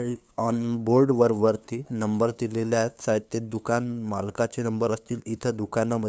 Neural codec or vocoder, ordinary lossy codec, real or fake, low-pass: codec, 16 kHz, 2 kbps, FunCodec, trained on LibriTTS, 25 frames a second; none; fake; none